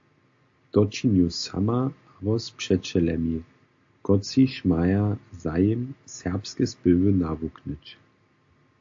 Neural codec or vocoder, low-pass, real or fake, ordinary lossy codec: none; 7.2 kHz; real; AAC, 48 kbps